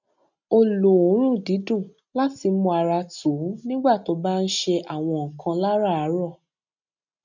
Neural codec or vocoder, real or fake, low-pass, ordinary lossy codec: none; real; 7.2 kHz; none